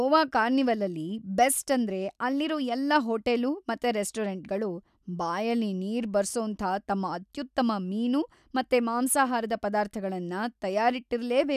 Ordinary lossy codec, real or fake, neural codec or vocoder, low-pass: none; real; none; 14.4 kHz